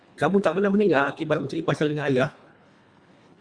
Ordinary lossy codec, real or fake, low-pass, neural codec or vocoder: Opus, 64 kbps; fake; 9.9 kHz; codec, 24 kHz, 1.5 kbps, HILCodec